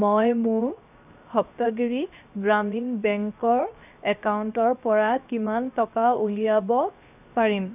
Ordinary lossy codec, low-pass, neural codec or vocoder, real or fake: none; 3.6 kHz; codec, 16 kHz, 0.7 kbps, FocalCodec; fake